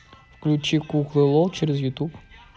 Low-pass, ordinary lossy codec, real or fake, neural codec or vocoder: none; none; real; none